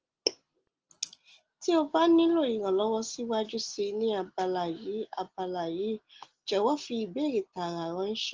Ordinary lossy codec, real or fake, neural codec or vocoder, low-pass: Opus, 16 kbps; real; none; 7.2 kHz